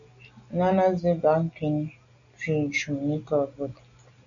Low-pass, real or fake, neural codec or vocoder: 7.2 kHz; real; none